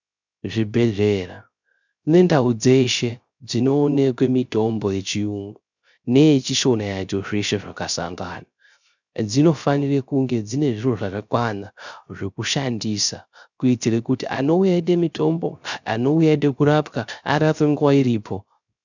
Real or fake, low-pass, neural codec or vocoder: fake; 7.2 kHz; codec, 16 kHz, 0.3 kbps, FocalCodec